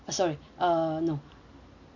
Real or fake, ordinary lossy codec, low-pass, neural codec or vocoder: real; none; 7.2 kHz; none